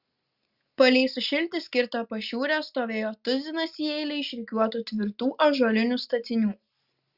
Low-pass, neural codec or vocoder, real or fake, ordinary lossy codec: 5.4 kHz; none; real; Opus, 64 kbps